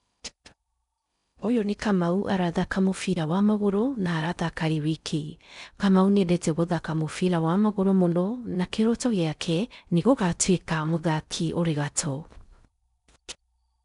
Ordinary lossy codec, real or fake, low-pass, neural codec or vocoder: none; fake; 10.8 kHz; codec, 16 kHz in and 24 kHz out, 0.6 kbps, FocalCodec, streaming, 2048 codes